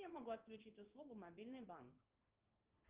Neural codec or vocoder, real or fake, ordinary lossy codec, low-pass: codec, 16 kHz in and 24 kHz out, 1 kbps, XY-Tokenizer; fake; Opus, 32 kbps; 3.6 kHz